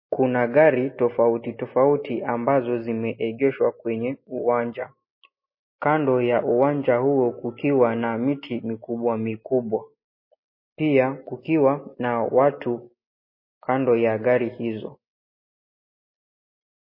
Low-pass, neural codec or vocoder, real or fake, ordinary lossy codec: 5.4 kHz; none; real; MP3, 24 kbps